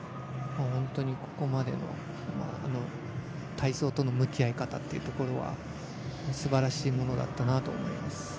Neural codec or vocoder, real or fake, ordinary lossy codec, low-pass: none; real; none; none